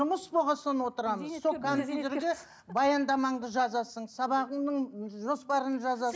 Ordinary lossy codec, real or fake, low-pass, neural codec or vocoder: none; real; none; none